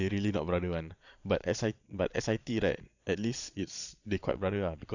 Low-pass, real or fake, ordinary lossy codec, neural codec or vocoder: 7.2 kHz; fake; none; vocoder, 22.05 kHz, 80 mel bands, Vocos